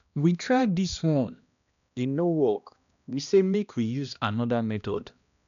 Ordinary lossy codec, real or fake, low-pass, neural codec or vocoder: none; fake; 7.2 kHz; codec, 16 kHz, 1 kbps, X-Codec, HuBERT features, trained on balanced general audio